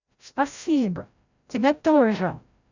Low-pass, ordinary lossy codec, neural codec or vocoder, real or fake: 7.2 kHz; none; codec, 16 kHz, 0.5 kbps, FreqCodec, larger model; fake